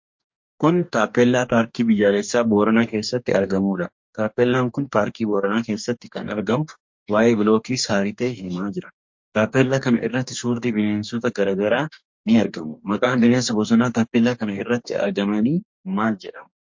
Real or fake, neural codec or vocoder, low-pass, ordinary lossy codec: fake; codec, 44.1 kHz, 2.6 kbps, DAC; 7.2 kHz; MP3, 48 kbps